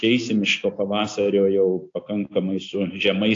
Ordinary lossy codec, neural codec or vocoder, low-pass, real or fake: AAC, 48 kbps; none; 7.2 kHz; real